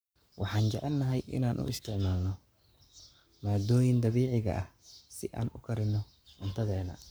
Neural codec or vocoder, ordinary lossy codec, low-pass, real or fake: codec, 44.1 kHz, 7.8 kbps, Pupu-Codec; none; none; fake